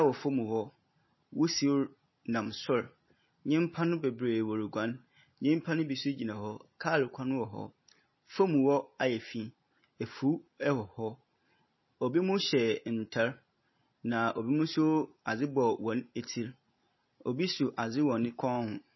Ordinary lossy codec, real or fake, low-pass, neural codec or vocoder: MP3, 24 kbps; real; 7.2 kHz; none